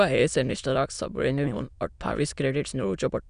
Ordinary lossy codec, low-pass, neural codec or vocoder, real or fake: none; 9.9 kHz; autoencoder, 22.05 kHz, a latent of 192 numbers a frame, VITS, trained on many speakers; fake